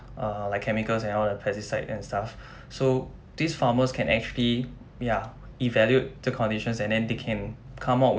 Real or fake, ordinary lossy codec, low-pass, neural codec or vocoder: real; none; none; none